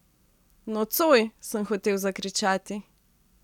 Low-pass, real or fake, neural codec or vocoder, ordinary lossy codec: 19.8 kHz; real; none; none